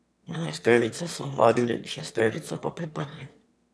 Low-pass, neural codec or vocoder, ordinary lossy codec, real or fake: none; autoencoder, 22.05 kHz, a latent of 192 numbers a frame, VITS, trained on one speaker; none; fake